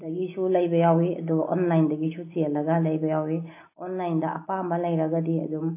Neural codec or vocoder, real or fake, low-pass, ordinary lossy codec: none; real; 3.6 kHz; AAC, 24 kbps